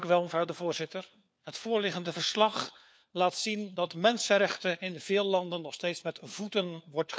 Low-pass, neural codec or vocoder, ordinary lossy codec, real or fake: none; codec, 16 kHz, 4 kbps, FunCodec, trained on LibriTTS, 50 frames a second; none; fake